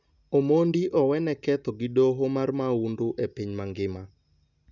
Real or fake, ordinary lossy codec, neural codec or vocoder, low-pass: real; none; none; 7.2 kHz